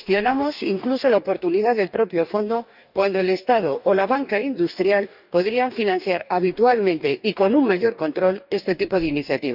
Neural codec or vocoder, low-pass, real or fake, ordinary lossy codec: codec, 44.1 kHz, 2.6 kbps, DAC; 5.4 kHz; fake; none